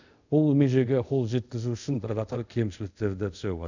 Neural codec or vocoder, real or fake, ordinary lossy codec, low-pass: codec, 24 kHz, 0.5 kbps, DualCodec; fake; none; 7.2 kHz